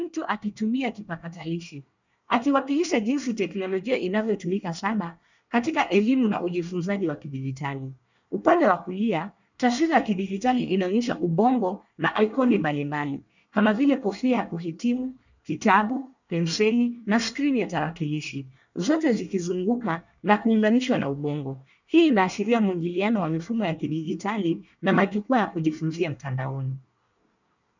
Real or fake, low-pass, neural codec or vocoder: fake; 7.2 kHz; codec, 24 kHz, 1 kbps, SNAC